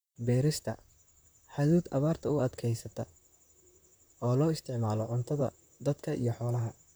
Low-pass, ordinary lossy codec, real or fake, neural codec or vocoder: none; none; fake; vocoder, 44.1 kHz, 128 mel bands, Pupu-Vocoder